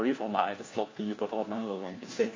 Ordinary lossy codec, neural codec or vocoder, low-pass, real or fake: AAC, 32 kbps; codec, 16 kHz, 1 kbps, FunCodec, trained on LibriTTS, 50 frames a second; 7.2 kHz; fake